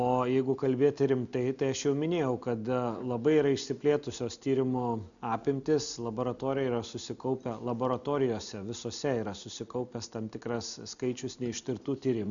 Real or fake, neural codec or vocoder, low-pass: real; none; 7.2 kHz